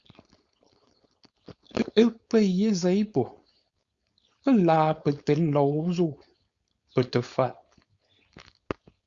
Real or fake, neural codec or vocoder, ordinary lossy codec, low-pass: fake; codec, 16 kHz, 4.8 kbps, FACodec; Opus, 64 kbps; 7.2 kHz